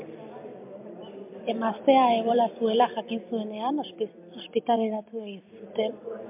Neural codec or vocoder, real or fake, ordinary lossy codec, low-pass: vocoder, 44.1 kHz, 128 mel bands every 256 samples, BigVGAN v2; fake; MP3, 32 kbps; 3.6 kHz